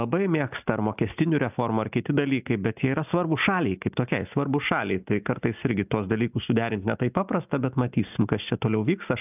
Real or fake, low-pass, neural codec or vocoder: real; 3.6 kHz; none